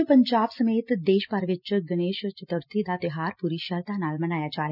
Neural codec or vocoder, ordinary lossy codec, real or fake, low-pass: none; none; real; 5.4 kHz